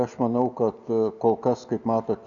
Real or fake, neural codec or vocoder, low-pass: real; none; 7.2 kHz